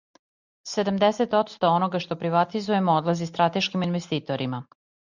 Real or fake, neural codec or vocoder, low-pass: real; none; 7.2 kHz